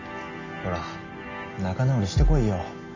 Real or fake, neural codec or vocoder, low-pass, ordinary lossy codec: real; none; 7.2 kHz; MP3, 32 kbps